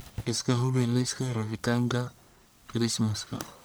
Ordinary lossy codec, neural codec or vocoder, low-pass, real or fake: none; codec, 44.1 kHz, 1.7 kbps, Pupu-Codec; none; fake